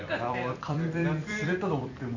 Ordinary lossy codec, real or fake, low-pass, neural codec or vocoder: none; fake; 7.2 kHz; vocoder, 44.1 kHz, 128 mel bands every 256 samples, BigVGAN v2